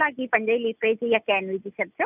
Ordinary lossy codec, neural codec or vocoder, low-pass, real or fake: none; none; 3.6 kHz; real